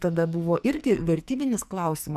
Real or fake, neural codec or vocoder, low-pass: fake; codec, 44.1 kHz, 2.6 kbps, SNAC; 14.4 kHz